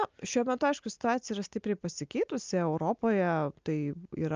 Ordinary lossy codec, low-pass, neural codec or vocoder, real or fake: Opus, 24 kbps; 7.2 kHz; none; real